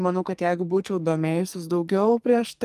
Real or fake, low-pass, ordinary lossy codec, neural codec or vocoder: fake; 14.4 kHz; Opus, 32 kbps; codec, 44.1 kHz, 2.6 kbps, SNAC